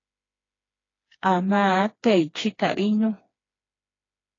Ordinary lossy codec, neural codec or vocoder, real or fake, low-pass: AAC, 32 kbps; codec, 16 kHz, 2 kbps, FreqCodec, smaller model; fake; 7.2 kHz